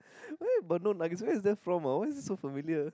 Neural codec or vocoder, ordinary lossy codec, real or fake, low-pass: none; none; real; none